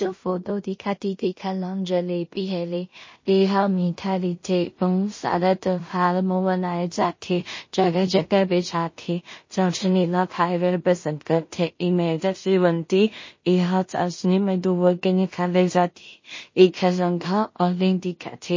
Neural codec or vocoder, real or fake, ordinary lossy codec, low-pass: codec, 16 kHz in and 24 kHz out, 0.4 kbps, LongCat-Audio-Codec, two codebook decoder; fake; MP3, 32 kbps; 7.2 kHz